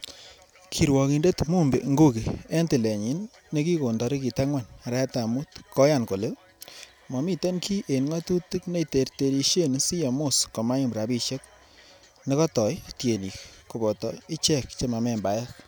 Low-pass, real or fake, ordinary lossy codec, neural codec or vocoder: none; real; none; none